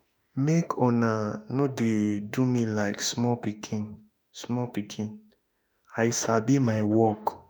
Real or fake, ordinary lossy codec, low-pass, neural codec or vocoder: fake; none; none; autoencoder, 48 kHz, 32 numbers a frame, DAC-VAE, trained on Japanese speech